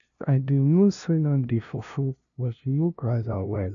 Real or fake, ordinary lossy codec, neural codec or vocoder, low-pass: fake; none; codec, 16 kHz, 0.5 kbps, FunCodec, trained on LibriTTS, 25 frames a second; 7.2 kHz